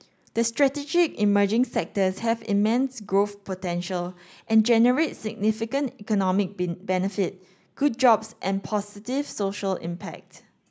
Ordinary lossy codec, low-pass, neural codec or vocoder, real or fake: none; none; none; real